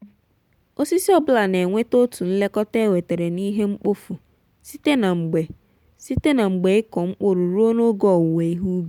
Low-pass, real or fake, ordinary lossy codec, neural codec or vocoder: 19.8 kHz; real; none; none